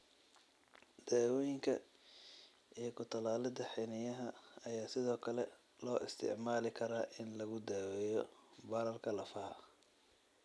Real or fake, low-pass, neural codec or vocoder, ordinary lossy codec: real; none; none; none